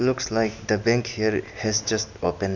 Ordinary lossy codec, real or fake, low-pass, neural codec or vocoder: none; real; 7.2 kHz; none